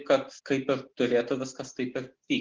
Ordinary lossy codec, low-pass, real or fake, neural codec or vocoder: Opus, 16 kbps; 7.2 kHz; real; none